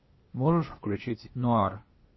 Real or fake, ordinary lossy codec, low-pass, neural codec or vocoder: fake; MP3, 24 kbps; 7.2 kHz; codec, 16 kHz, 0.8 kbps, ZipCodec